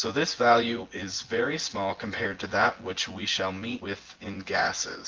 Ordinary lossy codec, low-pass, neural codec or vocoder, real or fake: Opus, 16 kbps; 7.2 kHz; vocoder, 24 kHz, 100 mel bands, Vocos; fake